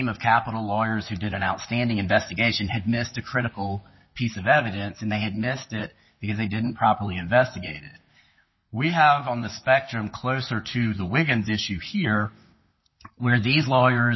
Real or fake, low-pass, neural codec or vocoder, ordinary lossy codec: fake; 7.2 kHz; vocoder, 44.1 kHz, 128 mel bands, Pupu-Vocoder; MP3, 24 kbps